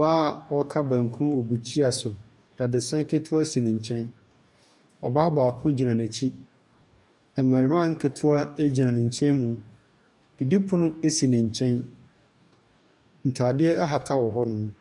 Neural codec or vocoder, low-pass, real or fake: codec, 44.1 kHz, 2.6 kbps, DAC; 10.8 kHz; fake